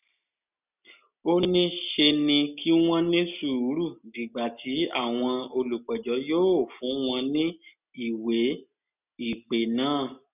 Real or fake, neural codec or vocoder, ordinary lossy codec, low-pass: real; none; none; 3.6 kHz